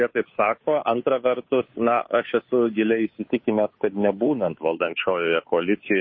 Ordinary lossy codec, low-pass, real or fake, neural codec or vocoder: MP3, 24 kbps; 7.2 kHz; fake; codec, 16 kHz, 2 kbps, FunCodec, trained on Chinese and English, 25 frames a second